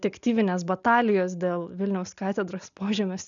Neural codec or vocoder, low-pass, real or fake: none; 7.2 kHz; real